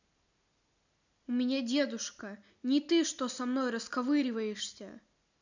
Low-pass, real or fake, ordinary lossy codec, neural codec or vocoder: 7.2 kHz; real; none; none